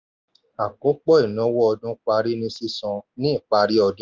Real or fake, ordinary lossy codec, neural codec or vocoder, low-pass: real; Opus, 24 kbps; none; 7.2 kHz